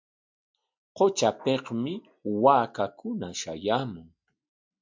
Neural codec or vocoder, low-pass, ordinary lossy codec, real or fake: none; 7.2 kHz; MP3, 64 kbps; real